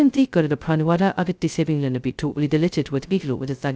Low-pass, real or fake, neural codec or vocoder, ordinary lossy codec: none; fake; codec, 16 kHz, 0.2 kbps, FocalCodec; none